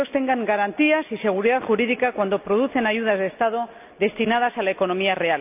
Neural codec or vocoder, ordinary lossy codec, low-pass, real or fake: none; none; 3.6 kHz; real